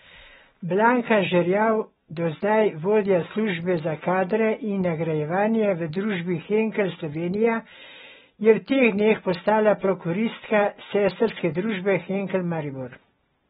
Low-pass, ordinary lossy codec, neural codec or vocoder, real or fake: 19.8 kHz; AAC, 16 kbps; none; real